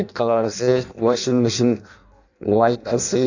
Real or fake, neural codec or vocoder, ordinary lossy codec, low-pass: fake; codec, 16 kHz in and 24 kHz out, 0.6 kbps, FireRedTTS-2 codec; none; 7.2 kHz